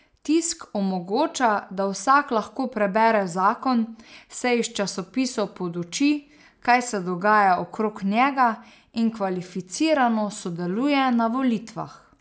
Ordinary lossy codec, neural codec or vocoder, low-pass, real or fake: none; none; none; real